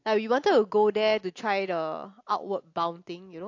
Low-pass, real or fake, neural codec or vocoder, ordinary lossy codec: 7.2 kHz; real; none; AAC, 48 kbps